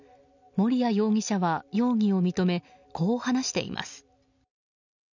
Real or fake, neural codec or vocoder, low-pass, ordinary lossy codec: real; none; 7.2 kHz; none